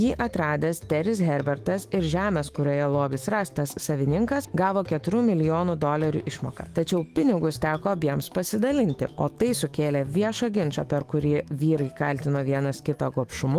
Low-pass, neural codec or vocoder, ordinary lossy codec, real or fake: 14.4 kHz; autoencoder, 48 kHz, 128 numbers a frame, DAC-VAE, trained on Japanese speech; Opus, 24 kbps; fake